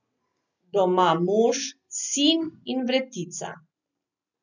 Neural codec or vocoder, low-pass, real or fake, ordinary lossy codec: none; 7.2 kHz; real; none